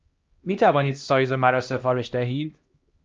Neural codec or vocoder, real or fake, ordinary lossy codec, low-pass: codec, 16 kHz, 1 kbps, X-Codec, HuBERT features, trained on LibriSpeech; fake; Opus, 24 kbps; 7.2 kHz